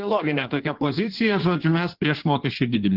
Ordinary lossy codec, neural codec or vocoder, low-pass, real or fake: Opus, 16 kbps; codec, 16 kHz in and 24 kHz out, 1.1 kbps, FireRedTTS-2 codec; 5.4 kHz; fake